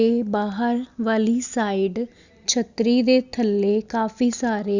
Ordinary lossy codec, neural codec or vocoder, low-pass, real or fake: Opus, 64 kbps; none; 7.2 kHz; real